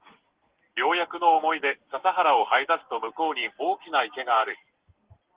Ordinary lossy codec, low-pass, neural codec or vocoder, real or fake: Opus, 16 kbps; 3.6 kHz; none; real